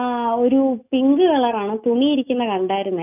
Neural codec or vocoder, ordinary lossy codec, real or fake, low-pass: none; none; real; 3.6 kHz